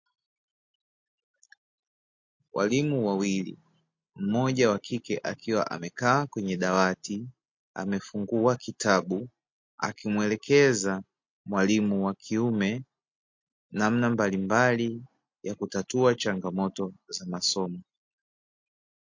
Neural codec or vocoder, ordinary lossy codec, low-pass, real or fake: none; MP3, 48 kbps; 7.2 kHz; real